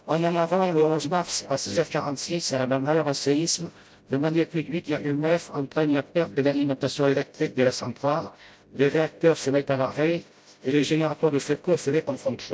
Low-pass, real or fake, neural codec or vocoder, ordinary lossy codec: none; fake; codec, 16 kHz, 0.5 kbps, FreqCodec, smaller model; none